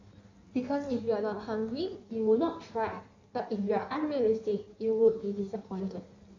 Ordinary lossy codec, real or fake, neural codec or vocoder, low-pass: AAC, 48 kbps; fake; codec, 16 kHz in and 24 kHz out, 1.1 kbps, FireRedTTS-2 codec; 7.2 kHz